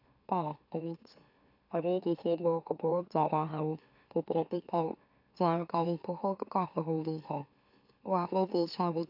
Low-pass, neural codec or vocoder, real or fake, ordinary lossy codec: 5.4 kHz; autoencoder, 44.1 kHz, a latent of 192 numbers a frame, MeloTTS; fake; AAC, 48 kbps